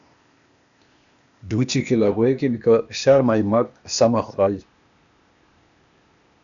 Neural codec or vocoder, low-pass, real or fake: codec, 16 kHz, 0.8 kbps, ZipCodec; 7.2 kHz; fake